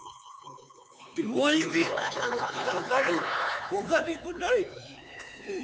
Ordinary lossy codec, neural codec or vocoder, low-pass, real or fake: none; codec, 16 kHz, 4 kbps, X-Codec, HuBERT features, trained on LibriSpeech; none; fake